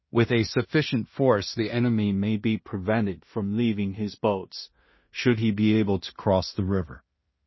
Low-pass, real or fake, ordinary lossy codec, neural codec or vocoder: 7.2 kHz; fake; MP3, 24 kbps; codec, 16 kHz in and 24 kHz out, 0.4 kbps, LongCat-Audio-Codec, two codebook decoder